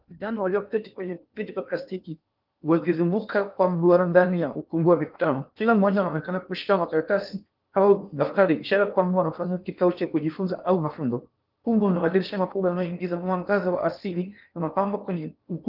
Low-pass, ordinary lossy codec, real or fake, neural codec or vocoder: 5.4 kHz; Opus, 24 kbps; fake; codec, 16 kHz in and 24 kHz out, 0.8 kbps, FocalCodec, streaming, 65536 codes